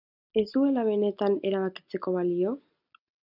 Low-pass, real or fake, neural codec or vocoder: 5.4 kHz; real; none